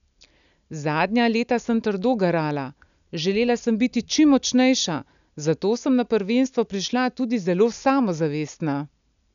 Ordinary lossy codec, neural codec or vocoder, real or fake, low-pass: none; none; real; 7.2 kHz